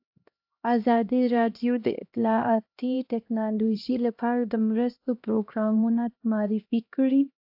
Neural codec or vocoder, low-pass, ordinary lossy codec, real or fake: codec, 16 kHz, 1 kbps, X-Codec, HuBERT features, trained on LibriSpeech; 5.4 kHz; AAC, 48 kbps; fake